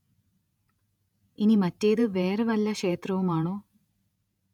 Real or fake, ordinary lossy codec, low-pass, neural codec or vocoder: fake; none; 19.8 kHz; vocoder, 48 kHz, 128 mel bands, Vocos